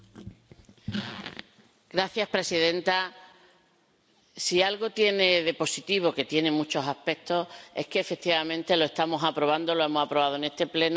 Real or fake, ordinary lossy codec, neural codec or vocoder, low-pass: real; none; none; none